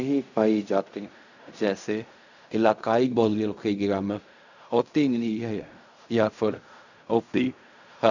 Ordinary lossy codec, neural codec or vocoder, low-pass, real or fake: none; codec, 16 kHz in and 24 kHz out, 0.4 kbps, LongCat-Audio-Codec, fine tuned four codebook decoder; 7.2 kHz; fake